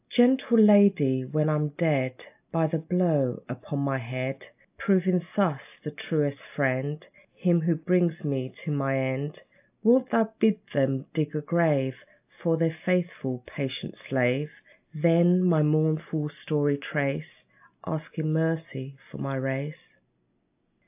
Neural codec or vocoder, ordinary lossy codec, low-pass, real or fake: none; AAC, 32 kbps; 3.6 kHz; real